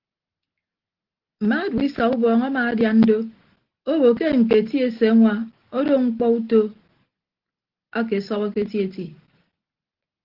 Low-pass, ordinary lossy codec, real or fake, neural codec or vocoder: 5.4 kHz; Opus, 16 kbps; real; none